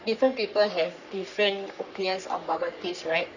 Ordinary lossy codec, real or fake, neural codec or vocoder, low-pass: none; fake; codec, 44.1 kHz, 3.4 kbps, Pupu-Codec; 7.2 kHz